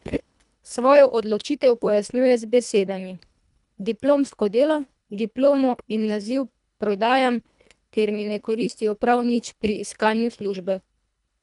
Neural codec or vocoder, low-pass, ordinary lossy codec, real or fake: codec, 24 kHz, 1.5 kbps, HILCodec; 10.8 kHz; none; fake